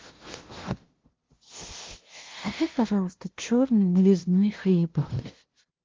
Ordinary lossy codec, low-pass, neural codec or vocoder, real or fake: Opus, 16 kbps; 7.2 kHz; codec, 16 kHz, 0.5 kbps, FunCodec, trained on LibriTTS, 25 frames a second; fake